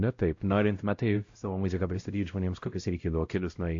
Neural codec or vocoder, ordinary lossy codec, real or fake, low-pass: codec, 16 kHz, 0.5 kbps, X-Codec, WavLM features, trained on Multilingual LibriSpeech; AAC, 48 kbps; fake; 7.2 kHz